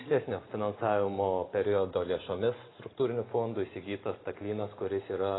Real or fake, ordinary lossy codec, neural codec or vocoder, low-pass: real; AAC, 16 kbps; none; 7.2 kHz